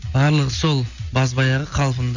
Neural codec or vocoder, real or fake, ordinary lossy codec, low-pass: none; real; none; 7.2 kHz